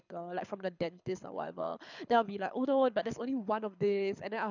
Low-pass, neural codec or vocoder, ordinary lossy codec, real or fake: 7.2 kHz; codec, 24 kHz, 6 kbps, HILCodec; none; fake